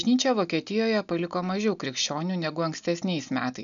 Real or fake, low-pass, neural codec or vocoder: real; 7.2 kHz; none